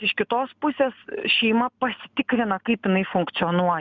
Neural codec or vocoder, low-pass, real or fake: none; 7.2 kHz; real